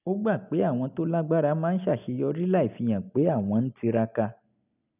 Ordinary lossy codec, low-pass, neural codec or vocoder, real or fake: none; 3.6 kHz; none; real